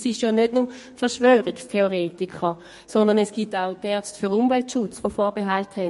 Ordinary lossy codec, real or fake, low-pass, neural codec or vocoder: MP3, 48 kbps; fake; 14.4 kHz; codec, 32 kHz, 1.9 kbps, SNAC